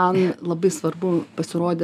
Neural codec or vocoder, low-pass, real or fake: none; 14.4 kHz; real